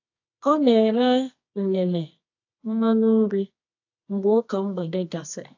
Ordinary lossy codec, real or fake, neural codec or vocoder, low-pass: AAC, 48 kbps; fake; codec, 24 kHz, 0.9 kbps, WavTokenizer, medium music audio release; 7.2 kHz